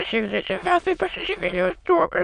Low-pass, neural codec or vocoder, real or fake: 9.9 kHz; autoencoder, 22.05 kHz, a latent of 192 numbers a frame, VITS, trained on many speakers; fake